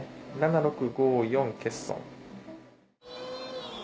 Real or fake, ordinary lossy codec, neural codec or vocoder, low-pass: real; none; none; none